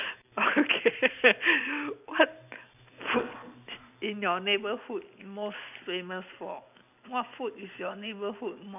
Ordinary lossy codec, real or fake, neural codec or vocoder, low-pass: none; real; none; 3.6 kHz